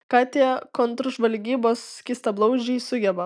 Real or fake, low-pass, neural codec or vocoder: real; 9.9 kHz; none